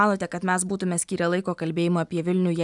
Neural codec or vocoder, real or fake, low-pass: none; real; 10.8 kHz